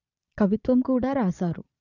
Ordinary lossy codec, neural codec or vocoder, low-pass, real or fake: none; vocoder, 22.05 kHz, 80 mel bands, Vocos; 7.2 kHz; fake